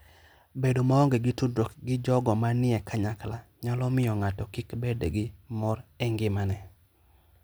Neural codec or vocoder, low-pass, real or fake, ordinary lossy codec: none; none; real; none